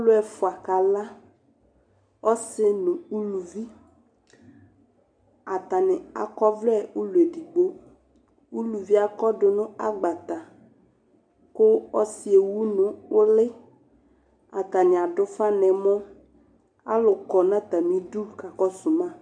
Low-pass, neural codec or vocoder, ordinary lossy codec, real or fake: 9.9 kHz; none; MP3, 96 kbps; real